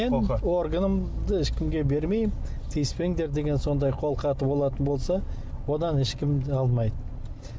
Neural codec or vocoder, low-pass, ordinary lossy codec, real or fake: none; none; none; real